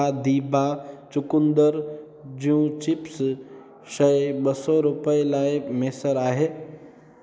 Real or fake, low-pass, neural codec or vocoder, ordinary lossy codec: real; none; none; none